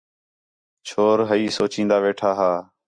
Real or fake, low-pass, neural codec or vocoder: real; 9.9 kHz; none